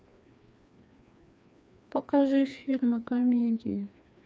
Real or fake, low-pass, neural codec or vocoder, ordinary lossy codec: fake; none; codec, 16 kHz, 2 kbps, FreqCodec, larger model; none